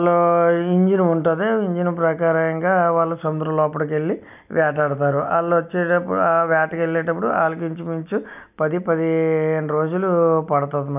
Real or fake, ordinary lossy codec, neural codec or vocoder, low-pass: real; none; none; 3.6 kHz